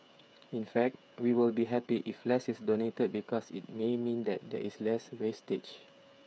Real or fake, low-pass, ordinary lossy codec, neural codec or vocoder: fake; none; none; codec, 16 kHz, 8 kbps, FreqCodec, smaller model